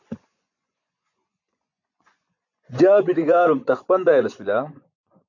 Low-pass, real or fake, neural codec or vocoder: 7.2 kHz; fake; vocoder, 24 kHz, 100 mel bands, Vocos